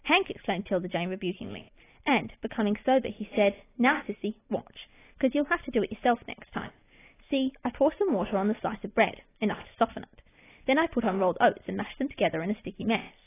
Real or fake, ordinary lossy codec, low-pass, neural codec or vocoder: real; AAC, 16 kbps; 3.6 kHz; none